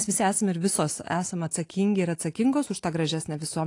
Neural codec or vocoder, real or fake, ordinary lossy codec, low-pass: none; real; AAC, 48 kbps; 10.8 kHz